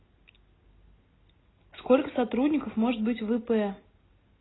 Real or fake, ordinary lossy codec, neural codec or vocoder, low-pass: real; AAC, 16 kbps; none; 7.2 kHz